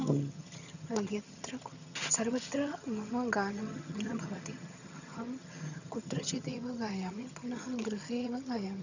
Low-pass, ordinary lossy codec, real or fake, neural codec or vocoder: 7.2 kHz; none; fake; vocoder, 22.05 kHz, 80 mel bands, HiFi-GAN